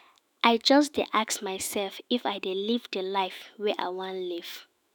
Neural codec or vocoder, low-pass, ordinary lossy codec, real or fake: autoencoder, 48 kHz, 128 numbers a frame, DAC-VAE, trained on Japanese speech; none; none; fake